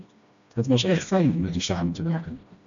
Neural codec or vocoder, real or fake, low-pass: codec, 16 kHz, 1 kbps, FreqCodec, smaller model; fake; 7.2 kHz